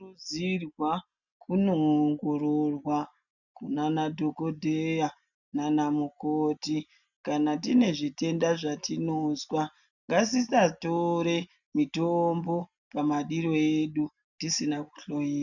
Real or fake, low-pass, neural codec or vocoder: real; 7.2 kHz; none